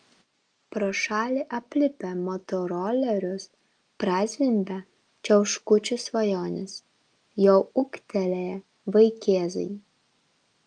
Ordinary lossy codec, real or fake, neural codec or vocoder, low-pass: Opus, 32 kbps; real; none; 9.9 kHz